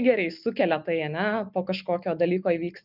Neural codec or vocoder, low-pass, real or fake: none; 5.4 kHz; real